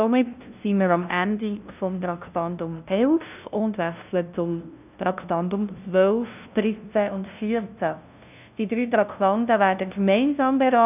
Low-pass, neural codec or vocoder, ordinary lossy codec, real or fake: 3.6 kHz; codec, 16 kHz, 0.5 kbps, FunCodec, trained on LibriTTS, 25 frames a second; none; fake